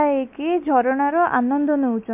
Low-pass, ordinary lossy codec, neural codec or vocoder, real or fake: 3.6 kHz; MP3, 32 kbps; none; real